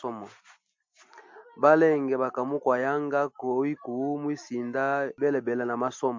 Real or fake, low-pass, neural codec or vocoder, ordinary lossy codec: real; 7.2 kHz; none; MP3, 48 kbps